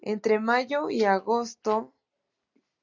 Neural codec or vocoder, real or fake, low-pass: none; real; 7.2 kHz